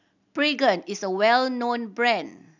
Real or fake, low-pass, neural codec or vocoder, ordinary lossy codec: real; 7.2 kHz; none; none